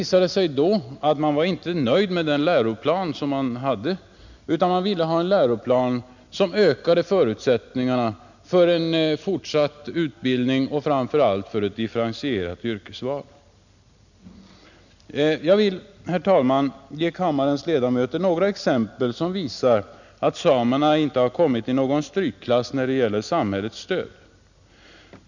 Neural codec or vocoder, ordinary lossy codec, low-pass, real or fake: none; none; 7.2 kHz; real